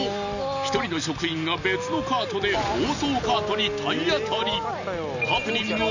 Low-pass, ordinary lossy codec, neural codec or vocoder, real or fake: 7.2 kHz; none; none; real